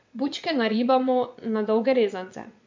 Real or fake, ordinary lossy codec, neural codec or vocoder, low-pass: fake; MP3, 48 kbps; vocoder, 22.05 kHz, 80 mel bands, Vocos; 7.2 kHz